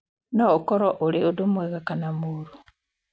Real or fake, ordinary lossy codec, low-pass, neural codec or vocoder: real; none; none; none